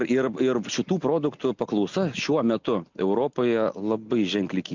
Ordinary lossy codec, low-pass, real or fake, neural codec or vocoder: MP3, 64 kbps; 7.2 kHz; real; none